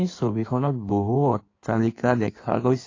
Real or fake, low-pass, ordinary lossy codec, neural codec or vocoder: fake; 7.2 kHz; AAC, 32 kbps; codec, 16 kHz in and 24 kHz out, 1.1 kbps, FireRedTTS-2 codec